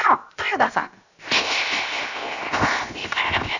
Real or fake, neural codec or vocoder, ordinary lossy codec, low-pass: fake; codec, 16 kHz, 0.7 kbps, FocalCodec; none; 7.2 kHz